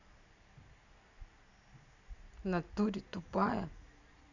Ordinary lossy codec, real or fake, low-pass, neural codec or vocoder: none; real; 7.2 kHz; none